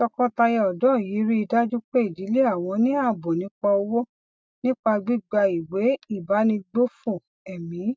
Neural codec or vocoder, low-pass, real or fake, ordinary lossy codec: none; none; real; none